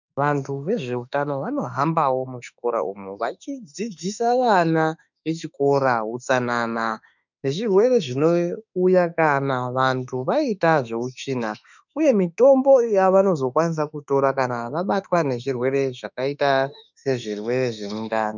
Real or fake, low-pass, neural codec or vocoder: fake; 7.2 kHz; autoencoder, 48 kHz, 32 numbers a frame, DAC-VAE, trained on Japanese speech